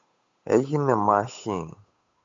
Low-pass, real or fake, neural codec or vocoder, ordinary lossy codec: 7.2 kHz; fake; codec, 16 kHz, 8 kbps, FunCodec, trained on Chinese and English, 25 frames a second; MP3, 48 kbps